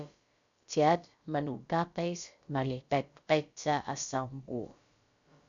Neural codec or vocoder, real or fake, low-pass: codec, 16 kHz, about 1 kbps, DyCAST, with the encoder's durations; fake; 7.2 kHz